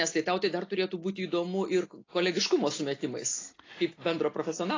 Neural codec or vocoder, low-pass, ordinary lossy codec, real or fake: none; 7.2 kHz; AAC, 32 kbps; real